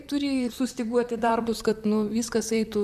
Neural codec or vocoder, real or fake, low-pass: vocoder, 44.1 kHz, 128 mel bands, Pupu-Vocoder; fake; 14.4 kHz